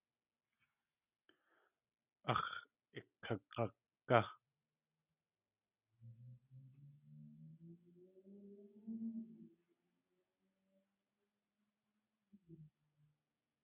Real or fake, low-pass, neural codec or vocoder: real; 3.6 kHz; none